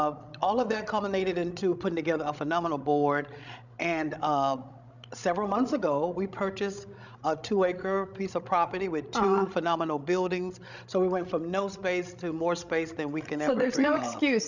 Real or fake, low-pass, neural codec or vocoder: fake; 7.2 kHz; codec, 16 kHz, 16 kbps, FreqCodec, larger model